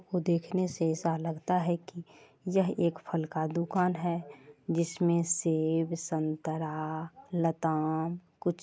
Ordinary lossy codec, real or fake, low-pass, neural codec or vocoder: none; real; none; none